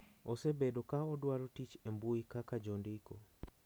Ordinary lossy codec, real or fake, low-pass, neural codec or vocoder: none; real; none; none